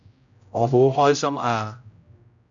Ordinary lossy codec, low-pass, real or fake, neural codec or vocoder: AAC, 64 kbps; 7.2 kHz; fake; codec, 16 kHz, 0.5 kbps, X-Codec, HuBERT features, trained on general audio